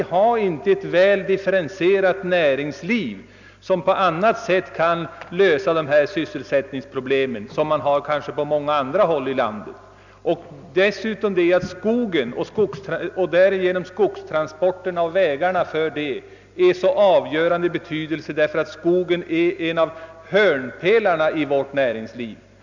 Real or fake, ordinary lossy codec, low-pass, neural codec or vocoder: real; none; 7.2 kHz; none